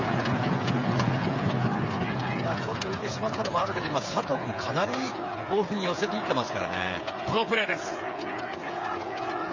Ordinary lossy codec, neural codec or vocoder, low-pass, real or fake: MP3, 32 kbps; codec, 16 kHz, 8 kbps, FreqCodec, smaller model; 7.2 kHz; fake